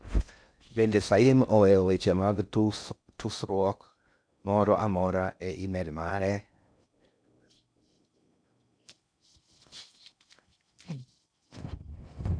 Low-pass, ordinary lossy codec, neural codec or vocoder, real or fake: 9.9 kHz; none; codec, 16 kHz in and 24 kHz out, 0.6 kbps, FocalCodec, streaming, 4096 codes; fake